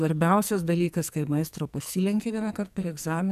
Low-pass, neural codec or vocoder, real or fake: 14.4 kHz; codec, 44.1 kHz, 2.6 kbps, SNAC; fake